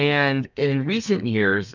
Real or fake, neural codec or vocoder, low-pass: fake; codec, 32 kHz, 1.9 kbps, SNAC; 7.2 kHz